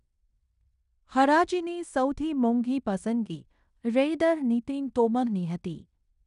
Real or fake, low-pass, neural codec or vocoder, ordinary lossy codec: fake; 10.8 kHz; codec, 16 kHz in and 24 kHz out, 0.9 kbps, LongCat-Audio-Codec, fine tuned four codebook decoder; none